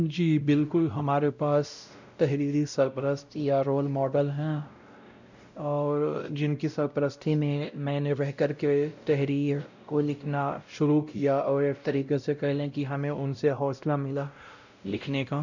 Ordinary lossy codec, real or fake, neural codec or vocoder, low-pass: none; fake; codec, 16 kHz, 0.5 kbps, X-Codec, WavLM features, trained on Multilingual LibriSpeech; 7.2 kHz